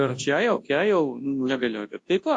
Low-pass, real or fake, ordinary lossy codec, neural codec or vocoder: 10.8 kHz; fake; AAC, 48 kbps; codec, 24 kHz, 0.9 kbps, WavTokenizer, large speech release